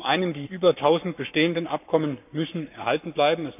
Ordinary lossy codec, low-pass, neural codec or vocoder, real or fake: none; 3.6 kHz; codec, 44.1 kHz, 7.8 kbps, Pupu-Codec; fake